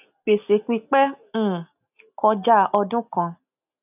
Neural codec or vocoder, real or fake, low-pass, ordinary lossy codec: none; real; 3.6 kHz; none